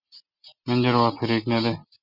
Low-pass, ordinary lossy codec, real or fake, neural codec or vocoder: 5.4 kHz; Opus, 64 kbps; real; none